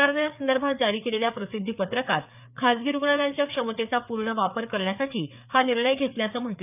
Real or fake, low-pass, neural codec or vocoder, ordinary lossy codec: fake; 3.6 kHz; codec, 16 kHz, 4 kbps, FreqCodec, larger model; none